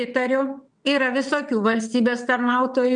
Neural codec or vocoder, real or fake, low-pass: vocoder, 22.05 kHz, 80 mel bands, WaveNeXt; fake; 9.9 kHz